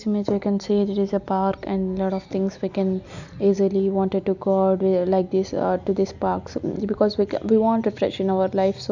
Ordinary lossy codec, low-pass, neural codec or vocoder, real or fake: none; 7.2 kHz; none; real